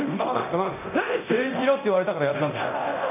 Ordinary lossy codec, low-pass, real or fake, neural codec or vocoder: none; 3.6 kHz; fake; codec, 24 kHz, 0.9 kbps, DualCodec